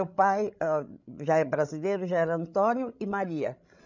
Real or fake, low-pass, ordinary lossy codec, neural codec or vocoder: fake; 7.2 kHz; none; codec, 16 kHz, 16 kbps, FreqCodec, larger model